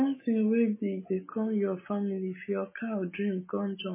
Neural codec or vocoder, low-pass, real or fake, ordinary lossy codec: none; 3.6 kHz; real; MP3, 16 kbps